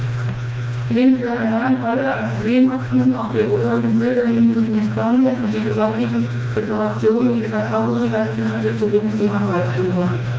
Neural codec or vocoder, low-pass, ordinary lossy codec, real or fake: codec, 16 kHz, 1 kbps, FreqCodec, smaller model; none; none; fake